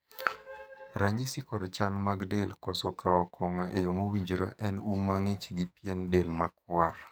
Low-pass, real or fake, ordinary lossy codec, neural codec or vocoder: none; fake; none; codec, 44.1 kHz, 2.6 kbps, SNAC